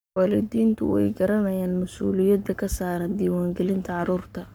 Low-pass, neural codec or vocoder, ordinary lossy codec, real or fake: none; codec, 44.1 kHz, 7.8 kbps, Pupu-Codec; none; fake